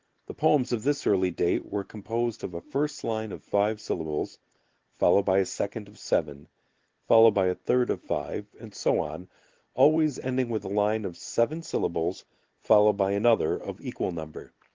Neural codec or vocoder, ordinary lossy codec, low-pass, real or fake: none; Opus, 16 kbps; 7.2 kHz; real